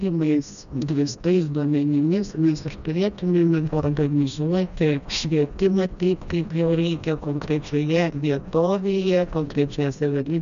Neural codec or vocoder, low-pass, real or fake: codec, 16 kHz, 1 kbps, FreqCodec, smaller model; 7.2 kHz; fake